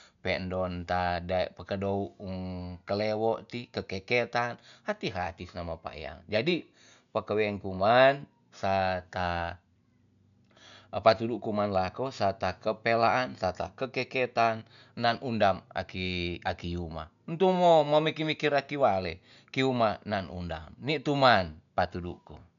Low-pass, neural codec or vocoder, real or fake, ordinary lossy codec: 7.2 kHz; none; real; none